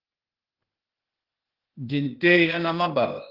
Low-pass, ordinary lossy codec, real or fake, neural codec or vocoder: 5.4 kHz; Opus, 16 kbps; fake; codec, 16 kHz, 0.8 kbps, ZipCodec